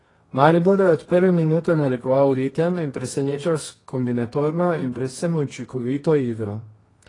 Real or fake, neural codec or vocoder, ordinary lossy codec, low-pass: fake; codec, 24 kHz, 0.9 kbps, WavTokenizer, medium music audio release; AAC, 32 kbps; 10.8 kHz